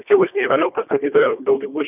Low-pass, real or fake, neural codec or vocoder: 3.6 kHz; fake; codec, 24 kHz, 1.5 kbps, HILCodec